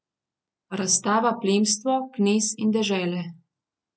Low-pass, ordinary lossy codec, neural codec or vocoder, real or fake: none; none; none; real